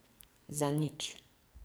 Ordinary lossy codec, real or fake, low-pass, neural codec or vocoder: none; fake; none; codec, 44.1 kHz, 2.6 kbps, SNAC